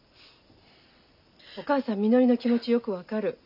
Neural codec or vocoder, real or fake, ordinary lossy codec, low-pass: none; real; none; 5.4 kHz